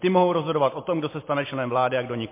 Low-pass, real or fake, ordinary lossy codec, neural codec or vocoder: 3.6 kHz; real; MP3, 24 kbps; none